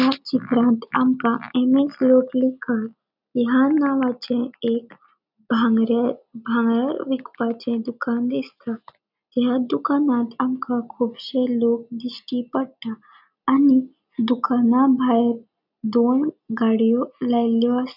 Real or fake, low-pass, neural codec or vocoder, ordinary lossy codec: real; 5.4 kHz; none; none